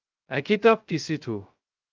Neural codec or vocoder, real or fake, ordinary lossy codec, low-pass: codec, 16 kHz, 0.2 kbps, FocalCodec; fake; Opus, 24 kbps; 7.2 kHz